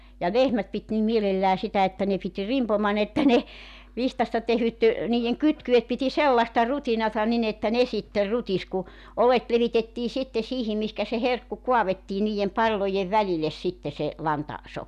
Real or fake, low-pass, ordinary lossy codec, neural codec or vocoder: real; 14.4 kHz; none; none